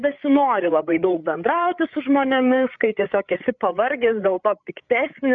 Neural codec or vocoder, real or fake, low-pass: codec, 16 kHz, 8 kbps, FreqCodec, larger model; fake; 7.2 kHz